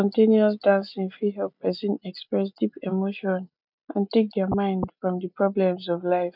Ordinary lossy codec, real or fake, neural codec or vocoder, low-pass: none; real; none; 5.4 kHz